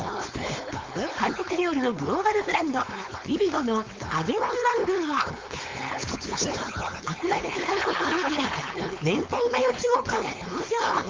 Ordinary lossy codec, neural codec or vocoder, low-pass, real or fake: Opus, 32 kbps; codec, 16 kHz, 4.8 kbps, FACodec; 7.2 kHz; fake